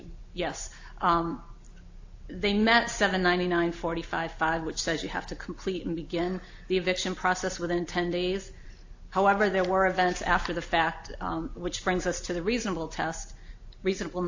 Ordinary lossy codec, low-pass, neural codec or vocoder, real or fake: AAC, 48 kbps; 7.2 kHz; none; real